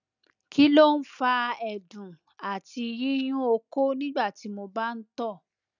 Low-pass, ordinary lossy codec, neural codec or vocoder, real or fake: 7.2 kHz; none; none; real